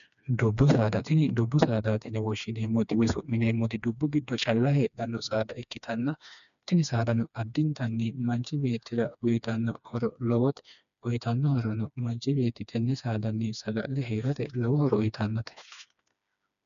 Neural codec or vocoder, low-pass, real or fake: codec, 16 kHz, 2 kbps, FreqCodec, smaller model; 7.2 kHz; fake